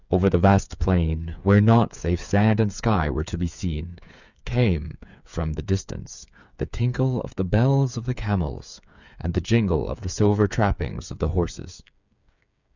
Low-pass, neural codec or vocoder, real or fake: 7.2 kHz; codec, 16 kHz, 8 kbps, FreqCodec, smaller model; fake